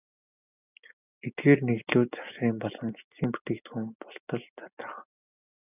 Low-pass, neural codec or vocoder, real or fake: 3.6 kHz; none; real